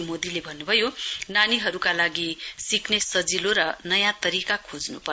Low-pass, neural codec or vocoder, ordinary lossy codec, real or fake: none; none; none; real